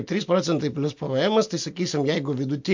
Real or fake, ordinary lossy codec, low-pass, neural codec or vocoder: real; MP3, 48 kbps; 7.2 kHz; none